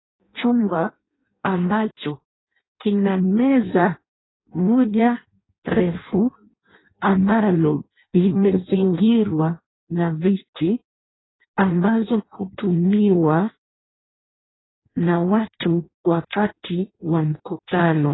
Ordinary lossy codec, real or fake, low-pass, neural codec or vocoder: AAC, 16 kbps; fake; 7.2 kHz; codec, 16 kHz in and 24 kHz out, 0.6 kbps, FireRedTTS-2 codec